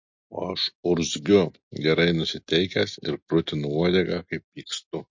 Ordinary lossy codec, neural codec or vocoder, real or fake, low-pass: MP3, 48 kbps; none; real; 7.2 kHz